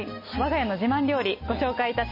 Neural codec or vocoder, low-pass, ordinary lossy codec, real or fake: none; 5.4 kHz; MP3, 24 kbps; real